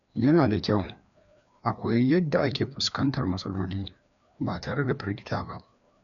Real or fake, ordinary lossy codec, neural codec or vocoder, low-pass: fake; none; codec, 16 kHz, 2 kbps, FreqCodec, larger model; 7.2 kHz